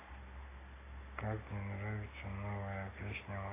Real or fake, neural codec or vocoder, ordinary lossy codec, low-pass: real; none; AAC, 16 kbps; 3.6 kHz